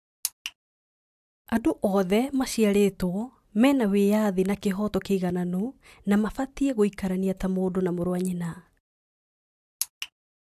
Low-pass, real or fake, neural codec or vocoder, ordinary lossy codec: 14.4 kHz; real; none; AAC, 96 kbps